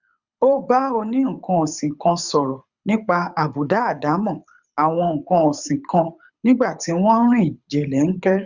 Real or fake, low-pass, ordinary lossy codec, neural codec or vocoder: fake; 7.2 kHz; Opus, 64 kbps; codec, 24 kHz, 6 kbps, HILCodec